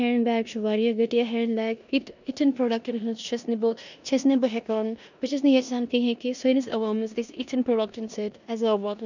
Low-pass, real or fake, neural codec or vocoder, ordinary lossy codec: 7.2 kHz; fake; codec, 16 kHz in and 24 kHz out, 0.9 kbps, LongCat-Audio-Codec, four codebook decoder; none